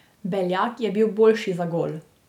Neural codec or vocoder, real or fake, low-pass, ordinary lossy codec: none; real; 19.8 kHz; none